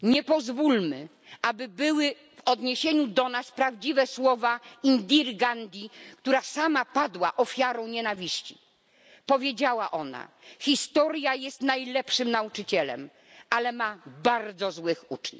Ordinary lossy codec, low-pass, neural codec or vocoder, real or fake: none; none; none; real